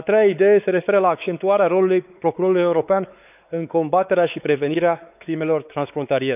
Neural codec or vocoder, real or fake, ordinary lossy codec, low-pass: codec, 16 kHz, 4 kbps, X-Codec, WavLM features, trained on Multilingual LibriSpeech; fake; none; 3.6 kHz